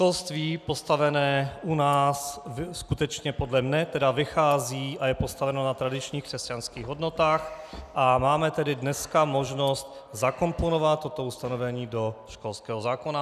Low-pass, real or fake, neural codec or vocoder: 14.4 kHz; real; none